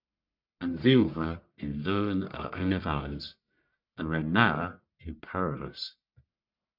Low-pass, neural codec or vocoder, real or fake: 5.4 kHz; codec, 44.1 kHz, 1.7 kbps, Pupu-Codec; fake